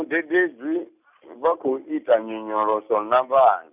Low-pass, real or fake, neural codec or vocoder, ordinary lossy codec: 3.6 kHz; real; none; none